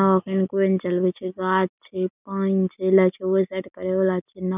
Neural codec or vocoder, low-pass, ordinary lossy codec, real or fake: none; 3.6 kHz; none; real